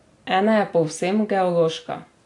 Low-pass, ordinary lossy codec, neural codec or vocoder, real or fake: 10.8 kHz; AAC, 48 kbps; none; real